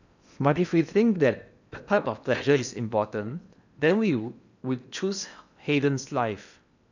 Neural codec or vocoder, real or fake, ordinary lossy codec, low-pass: codec, 16 kHz in and 24 kHz out, 0.8 kbps, FocalCodec, streaming, 65536 codes; fake; none; 7.2 kHz